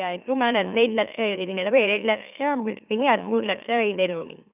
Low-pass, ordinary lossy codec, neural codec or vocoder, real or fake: 3.6 kHz; none; autoencoder, 44.1 kHz, a latent of 192 numbers a frame, MeloTTS; fake